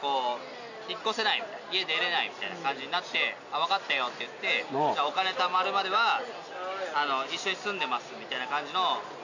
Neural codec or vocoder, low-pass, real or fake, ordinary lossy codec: none; 7.2 kHz; real; none